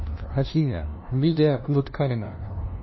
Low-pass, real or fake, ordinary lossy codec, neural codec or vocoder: 7.2 kHz; fake; MP3, 24 kbps; codec, 16 kHz, 0.5 kbps, FunCodec, trained on LibriTTS, 25 frames a second